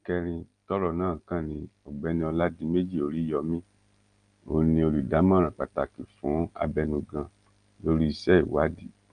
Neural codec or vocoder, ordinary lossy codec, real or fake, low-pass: none; Opus, 32 kbps; real; 9.9 kHz